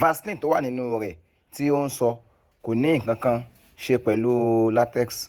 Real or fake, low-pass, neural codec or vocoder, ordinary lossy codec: fake; 19.8 kHz; vocoder, 48 kHz, 128 mel bands, Vocos; Opus, 64 kbps